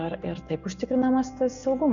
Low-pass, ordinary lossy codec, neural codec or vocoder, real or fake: 7.2 kHz; Opus, 64 kbps; none; real